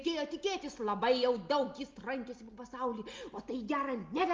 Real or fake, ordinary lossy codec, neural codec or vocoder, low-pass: real; Opus, 32 kbps; none; 7.2 kHz